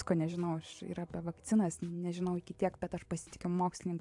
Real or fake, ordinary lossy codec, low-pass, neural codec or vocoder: fake; AAC, 64 kbps; 10.8 kHz; vocoder, 44.1 kHz, 128 mel bands every 512 samples, BigVGAN v2